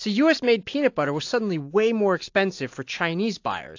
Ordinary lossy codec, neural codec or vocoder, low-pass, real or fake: AAC, 48 kbps; none; 7.2 kHz; real